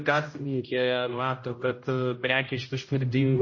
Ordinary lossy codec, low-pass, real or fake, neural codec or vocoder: MP3, 32 kbps; 7.2 kHz; fake; codec, 16 kHz, 0.5 kbps, X-Codec, HuBERT features, trained on general audio